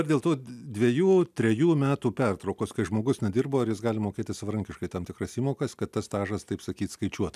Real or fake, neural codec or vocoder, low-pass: real; none; 14.4 kHz